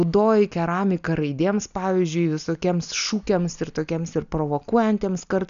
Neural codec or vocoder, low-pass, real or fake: none; 7.2 kHz; real